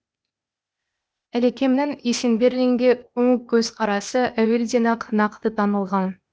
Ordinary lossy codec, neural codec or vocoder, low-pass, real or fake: none; codec, 16 kHz, 0.8 kbps, ZipCodec; none; fake